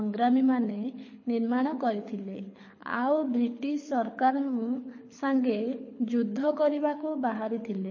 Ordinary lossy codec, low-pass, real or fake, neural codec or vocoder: MP3, 32 kbps; 7.2 kHz; fake; codec, 24 kHz, 6 kbps, HILCodec